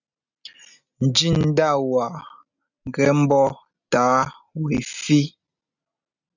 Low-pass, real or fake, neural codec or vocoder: 7.2 kHz; real; none